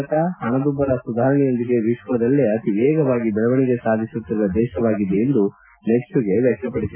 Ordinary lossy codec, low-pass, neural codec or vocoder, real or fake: MP3, 24 kbps; 3.6 kHz; none; real